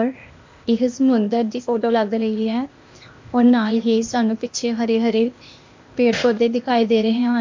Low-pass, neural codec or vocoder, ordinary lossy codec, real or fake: 7.2 kHz; codec, 16 kHz, 0.8 kbps, ZipCodec; MP3, 48 kbps; fake